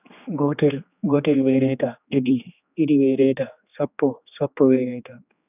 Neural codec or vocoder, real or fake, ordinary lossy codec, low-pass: codec, 16 kHz, 2 kbps, X-Codec, HuBERT features, trained on general audio; fake; none; 3.6 kHz